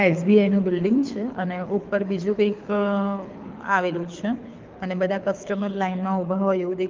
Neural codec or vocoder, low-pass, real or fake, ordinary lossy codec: codec, 24 kHz, 6 kbps, HILCodec; 7.2 kHz; fake; Opus, 32 kbps